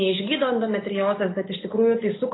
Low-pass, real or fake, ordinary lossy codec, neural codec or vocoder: 7.2 kHz; real; AAC, 16 kbps; none